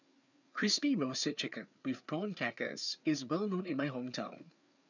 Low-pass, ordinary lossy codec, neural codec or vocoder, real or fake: 7.2 kHz; none; codec, 16 kHz, 4 kbps, FreqCodec, larger model; fake